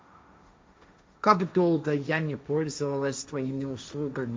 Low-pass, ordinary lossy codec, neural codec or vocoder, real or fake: none; none; codec, 16 kHz, 1.1 kbps, Voila-Tokenizer; fake